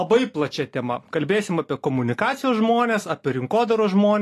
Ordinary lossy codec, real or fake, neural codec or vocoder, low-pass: AAC, 48 kbps; real; none; 14.4 kHz